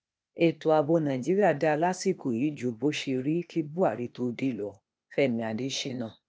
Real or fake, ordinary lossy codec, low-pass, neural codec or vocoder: fake; none; none; codec, 16 kHz, 0.8 kbps, ZipCodec